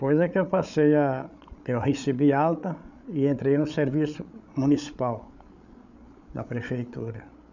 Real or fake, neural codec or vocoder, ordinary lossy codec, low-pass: fake; codec, 16 kHz, 8 kbps, FreqCodec, larger model; none; 7.2 kHz